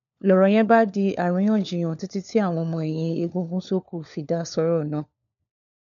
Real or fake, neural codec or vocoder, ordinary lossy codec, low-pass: fake; codec, 16 kHz, 4 kbps, FunCodec, trained on LibriTTS, 50 frames a second; none; 7.2 kHz